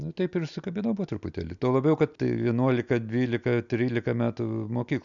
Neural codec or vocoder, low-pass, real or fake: none; 7.2 kHz; real